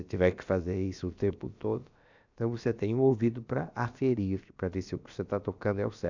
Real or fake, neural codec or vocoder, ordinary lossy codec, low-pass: fake; codec, 16 kHz, about 1 kbps, DyCAST, with the encoder's durations; none; 7.2 kHz